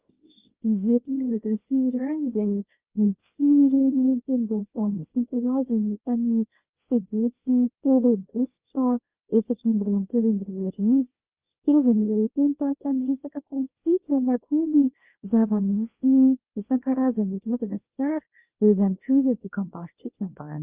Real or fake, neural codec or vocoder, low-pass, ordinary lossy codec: fake; codec, 24 kHz, 0.9 kbps, WavTokenizer, small release; 3.6 kHz; Opus, 24 kbps